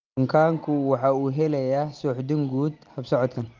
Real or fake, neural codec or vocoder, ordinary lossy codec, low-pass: real; none; Opus, 32 kbps; 7.2 kHz